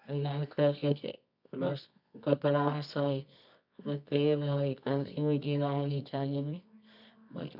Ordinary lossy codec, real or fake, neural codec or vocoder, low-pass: none; fake; codec, 24 kHz, 0.9 kbps, WavTokenizer, medium music audio release; 5.4 kHz